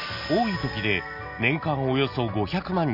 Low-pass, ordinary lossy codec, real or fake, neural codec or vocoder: 5.4 kHz; none; real; none